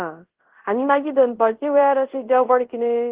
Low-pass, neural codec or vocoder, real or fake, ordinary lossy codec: 3.6 kHz; codec, 24 kHz, 0.5 kbps, DualCodec; fake; Opus, 16 kbps